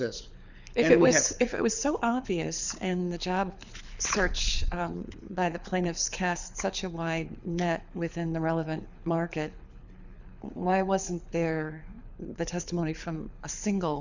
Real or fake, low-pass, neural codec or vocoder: fake; 7.2 kHz; codec, 24 kHz, 6 kbps, HILCodec